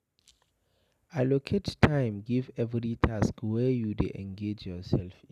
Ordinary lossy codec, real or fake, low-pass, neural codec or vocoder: none; real; 14.4 kHz; none